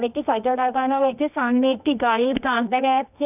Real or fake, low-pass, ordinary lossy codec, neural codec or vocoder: fake; 3.6 kHz; none; codec, 24 kHz, 0.9 kbps, WavTokenizer, medium music audio release